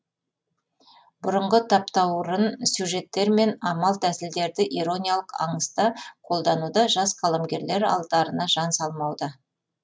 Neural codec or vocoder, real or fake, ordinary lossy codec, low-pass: none; real; none; none